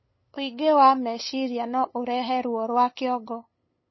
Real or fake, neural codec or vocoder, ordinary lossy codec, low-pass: fake; codec, 16 kHz, 8 kbps, FunCodec, trained on LibriTTS, 25 frames a second; MP3, 24 kbps; 7.2 kHz